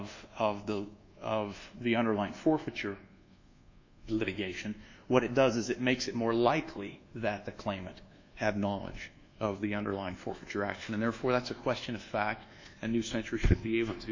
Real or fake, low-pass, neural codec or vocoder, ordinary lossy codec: fake; 7.2 kHz; codec, 24 kHz, 1.2 kbps, DualCodec; AAC, 48 kbps